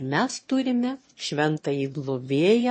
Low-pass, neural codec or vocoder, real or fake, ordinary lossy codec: 9.9 kHz; autoencoder, 22.05 kHz, a latent of 192 numbers a frame, VITS, trained on one speaker; fake; MP3, 32 kbps